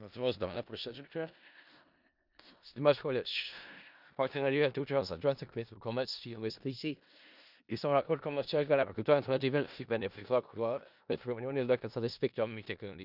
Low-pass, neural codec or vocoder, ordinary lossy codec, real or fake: 5.4 kHz; codec, 16 kHz in and 24 kHz out, 0.4 kbps, LongCat-Audio-Codec, four codebook decoder; none; fake